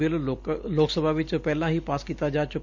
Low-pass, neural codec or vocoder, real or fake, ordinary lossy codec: 7.2 kHz; none; real; none